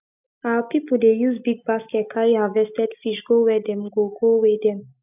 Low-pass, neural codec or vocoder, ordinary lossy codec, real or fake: 3.6 kHz; none; none; real